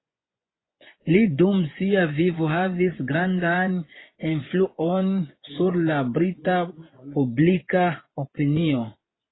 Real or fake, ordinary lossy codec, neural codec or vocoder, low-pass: fake; AAC, 16 kbps; vocoder, 24 kHz, 100 mel bands, Vocos; 7.2 kHz